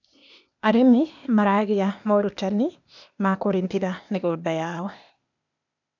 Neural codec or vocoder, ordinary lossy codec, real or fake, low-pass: codec, 16 kHz, 0.8 kbps, ZipCodec; none; fake; 7.2 kHz